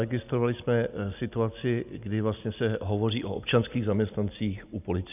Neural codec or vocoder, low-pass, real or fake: none; 3.6 kHz; real